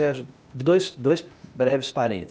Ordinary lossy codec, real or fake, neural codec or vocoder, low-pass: none; fake; codec, 16 kHz, 0.8 kbps, ZipCodec; none